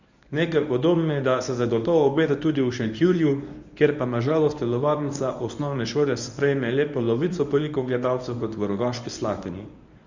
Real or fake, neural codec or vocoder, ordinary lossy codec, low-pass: fake; codec, 24 kHz, 0.9 kbps, WavTokenizer, medium speech release version 1; Opus, 64 kbps; 7.2 kHz